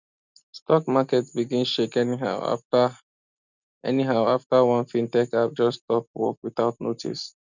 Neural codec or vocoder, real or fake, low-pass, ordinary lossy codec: none; real; 7.2 kHz; none